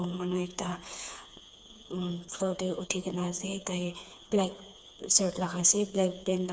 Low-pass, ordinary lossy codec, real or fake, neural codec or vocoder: none; none; fake; codec, 16 kHz, 4 kbps, FreqCodec, smaller model